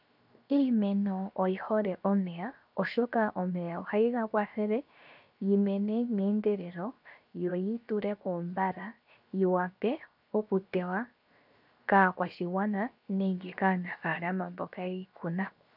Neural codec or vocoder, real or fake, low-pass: codec, 16 kHz, 0.7 kbps, FocalCodec; fake; 5.4 kHz